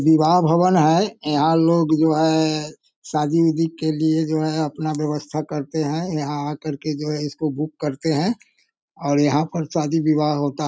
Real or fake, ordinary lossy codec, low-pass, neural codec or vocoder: real; none; none; none